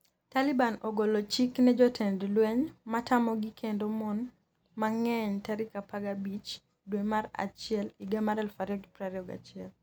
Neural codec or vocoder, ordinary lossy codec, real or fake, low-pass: none; none; real; none